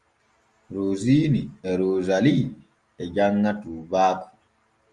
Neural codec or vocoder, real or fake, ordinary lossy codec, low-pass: none; real; Opus, 32 kbps; 10.8 kHz